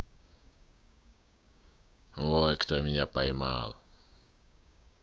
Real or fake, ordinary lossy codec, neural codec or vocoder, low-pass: fake; none; codec, 16 kHz, 6 kbps, DAC; none